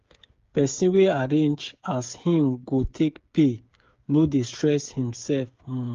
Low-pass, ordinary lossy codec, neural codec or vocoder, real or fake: 7.2 kHz; Opus, 32 kbps; codec, 16 kHz, 8 kbps, FreqCodec, smaller model; fake